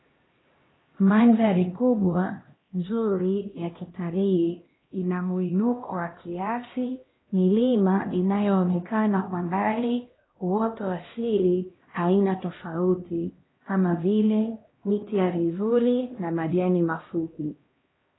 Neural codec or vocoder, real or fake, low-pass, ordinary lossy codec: codec, 16 kHz, 1 kbps, X-Codec, HuBERT features, trained on LibriSpeech; fake; 7.2 kHz; AAC, 16 kbps